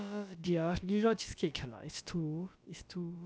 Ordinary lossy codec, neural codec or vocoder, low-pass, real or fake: none; codec, 16 kHz, about 1 kbps, DyCAST, with the encoder's durations; none; fake